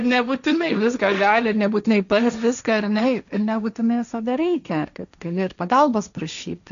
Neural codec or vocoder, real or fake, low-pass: codec, 16 kHz, 1.1 kbps, Voila-Tokenizer; fake; 7.2 kHz